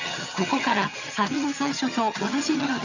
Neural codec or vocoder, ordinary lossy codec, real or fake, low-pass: vocoder, 22.05 kHz, 80 mel bands, HiFi-GAN; none; fake; 7.2 kHz